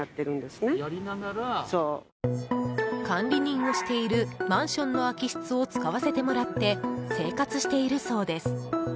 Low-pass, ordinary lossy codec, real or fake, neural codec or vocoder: none; none; real; none